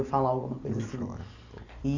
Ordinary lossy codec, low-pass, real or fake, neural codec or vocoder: AAC, 48 kbps; 7.2 kHz; fake; vocoder, 44.1 kHz, 128 mel bands every 256 samples, BigVGAN v2